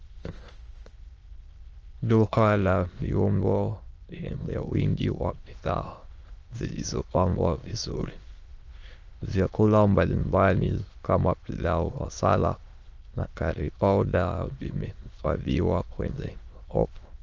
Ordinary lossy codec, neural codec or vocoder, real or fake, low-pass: Opus, 24 kbps; autoencoder, 22.05 kHz, a latent of 192 numbers a frame, VITS, trained on many speakers; fake; 7.2 kHz